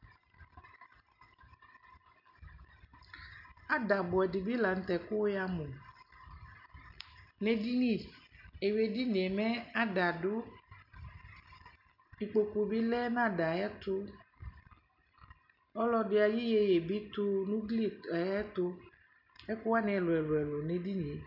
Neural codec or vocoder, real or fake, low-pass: none; real; 5.4 kHz